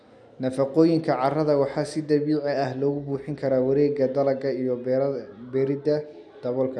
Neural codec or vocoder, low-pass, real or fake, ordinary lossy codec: none; none; real; none